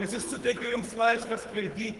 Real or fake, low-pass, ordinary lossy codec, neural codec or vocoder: fake; 10.8 kHz; Opus, 32 kbps; codec, 24 kHz, 3 kbps, HILCodec